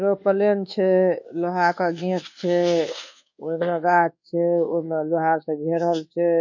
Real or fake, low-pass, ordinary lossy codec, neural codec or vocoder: fake; 7.2 kHz; none; codec, 24 kHz, 1.2 kbps, DualCodec